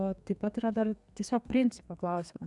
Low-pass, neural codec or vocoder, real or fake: 10.8 kHz; codec, 32 kHz, 1.9 kbps, SNAC; fake